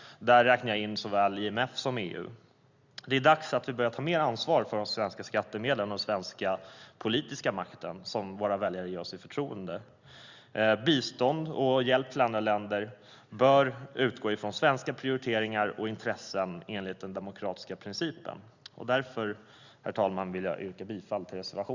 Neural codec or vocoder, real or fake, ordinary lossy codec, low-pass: none; real; Opus, 64 kbps; 7.2 kHz